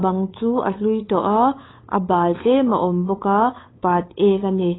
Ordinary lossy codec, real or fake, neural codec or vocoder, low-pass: AAC, 16 kbps; fake; codec, 16 kHz, 8 kbps, FunCodec, trained on LibriTTS, 25 frames a second; 7.2 kHz